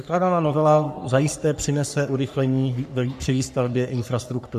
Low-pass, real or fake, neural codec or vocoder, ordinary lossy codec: 14.4 kHz; fake; codec, 44.1 kHz, 3.4 kbps, Pupu-Codec; AAC, 96 kbps